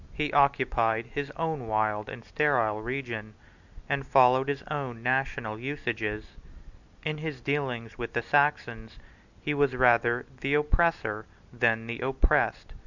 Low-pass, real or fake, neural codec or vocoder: 7.2 kHz; real; none